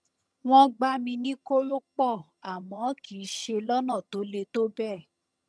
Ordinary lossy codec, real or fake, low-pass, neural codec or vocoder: none; fake; none; vocoder, 22.05 kHz, 80 mel bands, HiFi-GAN